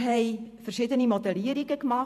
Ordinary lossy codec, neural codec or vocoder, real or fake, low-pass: none; vocoder, 48 kHz, 128 mel bands, Vocos; fake; 14.4 kHz